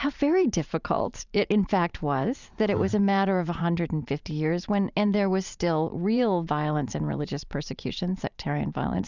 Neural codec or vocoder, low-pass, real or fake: vocoder, 44.1 kHz, 128 mel bands every 512 samples, BigVGAN v2; 7.2 kHz; fake